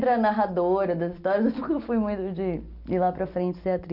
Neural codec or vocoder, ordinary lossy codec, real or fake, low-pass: none; none; real; 5.4 kHz